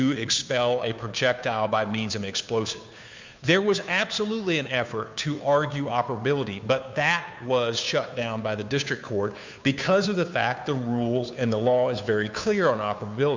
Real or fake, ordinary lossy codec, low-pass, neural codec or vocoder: fake; MP3, 64 kbps; 7.2 kHz; codec, 16 kHz, 2 kbps, FunCodec, trained on Chinese and English, 25 frames a second